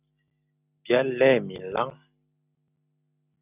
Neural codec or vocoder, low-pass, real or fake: none; 3.6 kHz; real